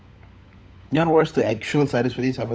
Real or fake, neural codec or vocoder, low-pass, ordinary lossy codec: fake; codec, 16 kHz, 16 kbps, FunCodec, trained on LibriTTS, 50 frames a second; none; none